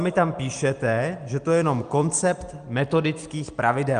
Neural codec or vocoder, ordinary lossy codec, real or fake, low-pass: none; Opus, 32 kbps; real; 9.9 kHz